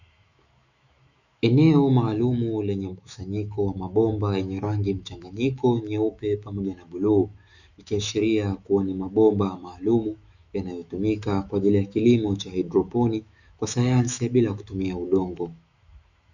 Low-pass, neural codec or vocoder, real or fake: 7.2 kHz; autoencoder, 48 kHz, 128 numbers a frame, DAC-VAE, trained on Japanese speech; fake